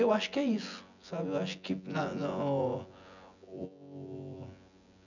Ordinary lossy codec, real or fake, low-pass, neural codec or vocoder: none; fake; 7.2 kHz; vocoder, 24 kHz, 100 mel bands, Vocos